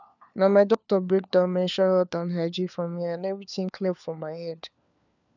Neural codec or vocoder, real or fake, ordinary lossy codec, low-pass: codec, 16 kHz, 4 kbps, FunCodec, trained on LibriTTS, 50 frames a second; fake; none; 7.2 kHz